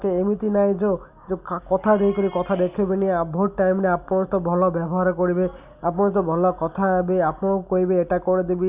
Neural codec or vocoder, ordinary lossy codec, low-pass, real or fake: none; Opus, 64 kbps; 3.6 kHz; real